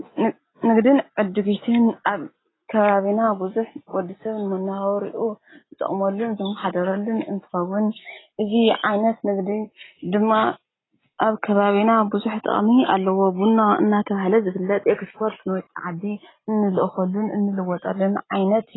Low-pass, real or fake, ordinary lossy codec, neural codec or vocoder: 7.2 kHz; real; AAC, 16 kbps; none